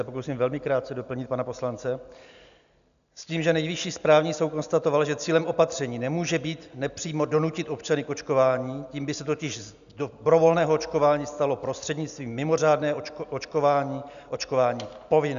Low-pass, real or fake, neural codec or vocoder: 7.2 kHz; real; none